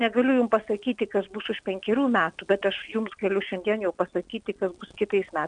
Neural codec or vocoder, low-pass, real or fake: none; 9.9 kHz; real